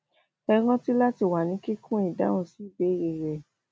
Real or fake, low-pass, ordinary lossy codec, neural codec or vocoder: real; none; none; none